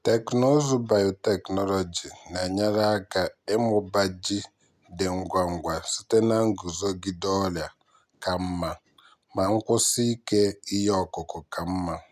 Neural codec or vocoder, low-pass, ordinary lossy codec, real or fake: none; 14.4 kHz; none; real